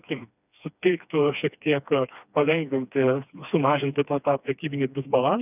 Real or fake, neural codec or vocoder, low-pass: fake; codec, 16 kHz, 2 kbps, FreqCodec, smaller model; 3.6 kHz